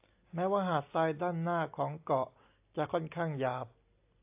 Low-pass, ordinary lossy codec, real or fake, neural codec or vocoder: 3.6 kHz; AAC, 32 kbps; real; none